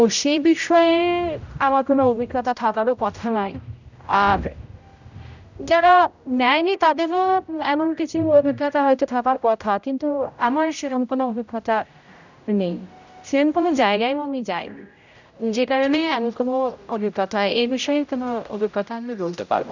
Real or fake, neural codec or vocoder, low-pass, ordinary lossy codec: fake; codec, 16 kHz, 0.5 kbps, X-Codec, HuBERT features, trained on general audio; 7.2 kHz; none